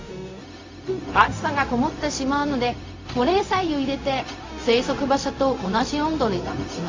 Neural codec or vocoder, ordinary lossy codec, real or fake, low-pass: codec, 16 kHz, 0.4 kbps, LongCat-Audio-Codec; AAC, 32 kbps; fake; 7.2 kHz